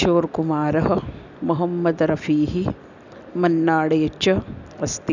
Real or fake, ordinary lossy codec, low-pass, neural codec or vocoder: real; none; 7.2 kHz; none